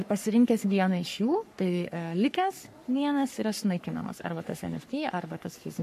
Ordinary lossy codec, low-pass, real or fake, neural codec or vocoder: MP3, 64 kbps; 14.4 kHz; fake; codec, 44.1 kHz, 3.4 kbps, Pupu-Codec